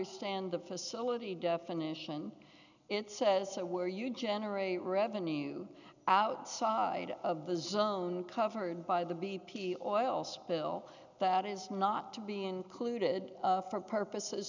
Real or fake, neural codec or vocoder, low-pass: real; none; 7.2 kHz